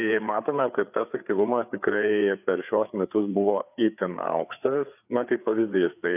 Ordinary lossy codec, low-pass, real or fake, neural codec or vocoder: AAC, 32 kbps; 3.6 kHz; fake; codec, 16 kHz, 4 kbps, FreqCodec, larger model